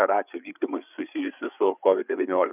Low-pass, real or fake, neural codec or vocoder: 3.6 kHz; fake; codec, 16 kHz, 8 kbps, FreqCodec, larger model